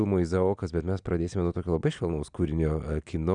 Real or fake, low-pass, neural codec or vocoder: real; 9.9 kHz; none